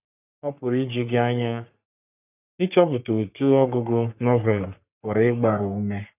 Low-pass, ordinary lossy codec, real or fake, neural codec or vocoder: 3.6 kHz; none; fake; codec, 44.1 kHz, 3.4 kbps, Pupu-Codec